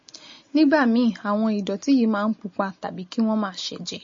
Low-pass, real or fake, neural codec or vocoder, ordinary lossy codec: 7.2 kHz; real; none; MP3, 32 kbps